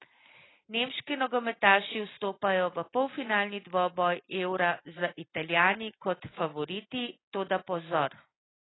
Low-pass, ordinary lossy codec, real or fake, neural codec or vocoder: 7.2 kHz; AAC, 16 kbps; real; none